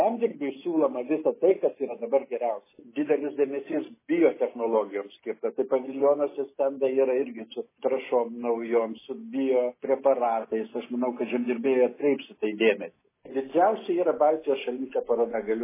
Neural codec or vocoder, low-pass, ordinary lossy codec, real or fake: none; 3.6 kHz; MP3, 16 kbps; real